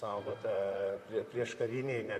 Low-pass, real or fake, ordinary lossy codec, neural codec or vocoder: 14.4 kHz; fake; AAC, 64 kbps; vocoder, 44.1 kHz, 128 mel bands, Pupu-Vocoder